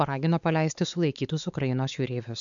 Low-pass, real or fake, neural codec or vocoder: 7.2 kHz; fake; codec, 16 kHz, 4 kbps, X-Codec, WavLM features, trained on Multilingual LibriSpeech